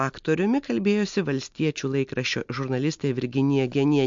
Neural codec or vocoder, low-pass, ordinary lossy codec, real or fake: none; 7.2 kHz; MP3, 48 kbps; real